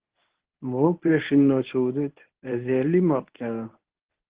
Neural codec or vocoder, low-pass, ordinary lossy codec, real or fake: codec, 24 kHz, 0.9 kbps, WavTokenizer, medium speech release version 1; 3.6 kHz; Opus, 16 kbps; fake